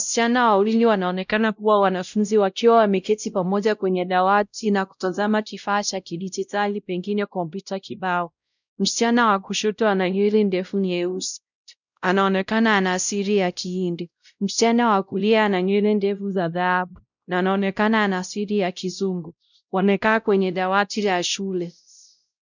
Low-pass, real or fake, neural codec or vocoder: 7.2 kHz; fake; codec, 16 kHz, 0.5 kbps, X-Codec, WavLM features, trained on Multilingual LibriSpeech